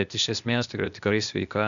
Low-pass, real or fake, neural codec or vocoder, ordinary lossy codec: 7.2 kHz; fake; codec, 16 kHz, 0.8 kbps, ZipCodec; MP3, 96 kbps